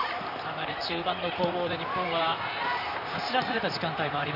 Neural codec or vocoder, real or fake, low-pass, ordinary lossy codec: vocoder, 44.1 kHz, 128 mel bands, Pupu-Vocoder; fake; 5.4 kHz; none